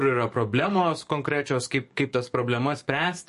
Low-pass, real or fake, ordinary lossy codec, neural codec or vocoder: 14.4 kHz; fake; MP3, 48 kbps; codec, 44.1 kHz, 7.8 kbps, DAC